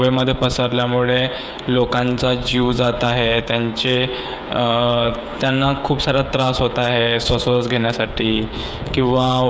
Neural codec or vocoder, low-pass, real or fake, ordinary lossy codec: codec, 16 kHz, 16 kbps, FreqCodec, smaller model; none; fake; none